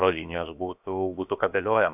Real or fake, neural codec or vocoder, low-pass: fake; codec, 16 kHz, about 1 kbps, DyCAST, with the encoder's durations; 3.6 kHz